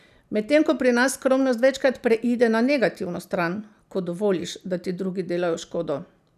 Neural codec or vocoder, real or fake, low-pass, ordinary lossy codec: none; real; 14.4 kHz; none